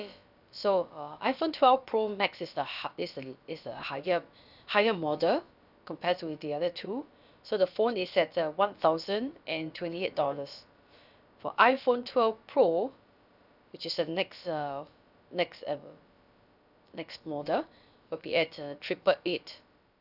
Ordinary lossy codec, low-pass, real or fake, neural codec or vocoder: none; 5.4 kHz; fake; codec, 16 kHz, about 1 kbps, DyCAST, with the encoder's durations